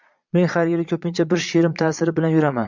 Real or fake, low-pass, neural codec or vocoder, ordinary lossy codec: fake; 7.2 kHz; vocoder, 22.05 kHz, 80 mel bands, Vocos; MP3, 64 kbps